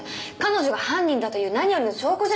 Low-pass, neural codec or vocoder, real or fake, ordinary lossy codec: none; none; real; none